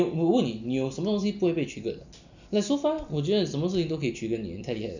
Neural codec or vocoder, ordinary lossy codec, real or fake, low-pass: none; none; real; 7.2 kHz